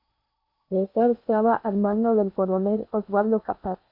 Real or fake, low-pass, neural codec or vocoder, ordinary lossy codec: fake; 5.4 kHz; codec, 16 kHz in and 24 kHz out, 0.8 kbps, FocalCodec, streaming, 65536 codes; MP3, 32 kbps